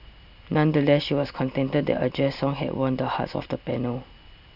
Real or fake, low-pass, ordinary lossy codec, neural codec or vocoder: real; 5.4 kHz; none; none